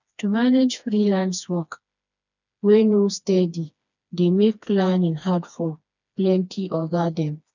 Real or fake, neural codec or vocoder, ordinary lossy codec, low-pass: fake; codec, 16 kHz, 2 kbps, FreqCodec, smaller model; none; 7.2 kHz